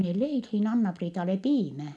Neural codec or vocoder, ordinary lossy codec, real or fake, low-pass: none; none; real; none